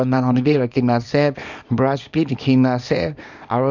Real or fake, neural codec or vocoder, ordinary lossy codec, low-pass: fake; codec, 24 kHz, 0.9 kbps, WavTokenizer, small release; none; 7.2 kHz